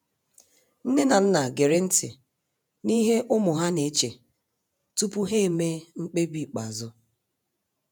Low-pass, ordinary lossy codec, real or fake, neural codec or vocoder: none; none; fake; vocoder, 48 kHz, 128 mel bands, Vocos